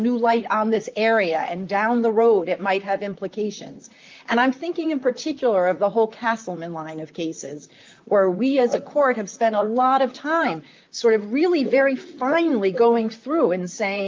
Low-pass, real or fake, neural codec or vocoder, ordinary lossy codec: 7.2 kHz; fake; vocoder, 44.1 kHz, 128 mel bands, Pupu-Vocoder; Opus, 24 kbps